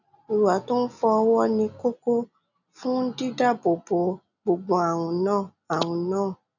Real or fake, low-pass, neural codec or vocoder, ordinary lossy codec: real; 7.2 kHz; none; none